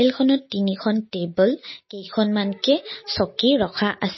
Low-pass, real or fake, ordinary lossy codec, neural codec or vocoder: 7.2 kHz; real; MP3, 24 kbps; none